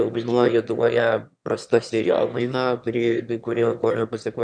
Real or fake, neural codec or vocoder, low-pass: fake; autoencoder, 22.05 kHz, a latent of 192 numbers a frame, VITS, trained on one speaker; 9.9 kHz